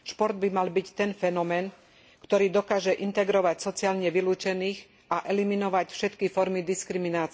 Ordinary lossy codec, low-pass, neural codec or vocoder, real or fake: none; none; none; real